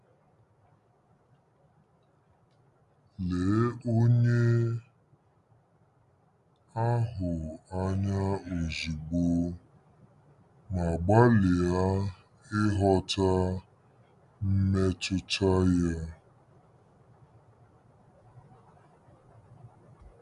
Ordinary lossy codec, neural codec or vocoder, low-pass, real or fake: MP3, 96 kbps; none; 10.8 kHz; real